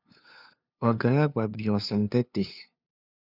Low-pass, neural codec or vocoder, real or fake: 5.4 kHz; codec, 16 kHz, 2 kbps, FunCodec, trained on LibriTTS, 25 frames a second; fake